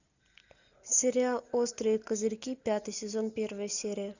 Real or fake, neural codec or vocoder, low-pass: real; none; 7.2 kHz